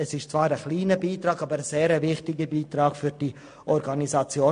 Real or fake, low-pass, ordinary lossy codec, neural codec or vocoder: real; 9.9 kHz; MP3, 48 kbps; none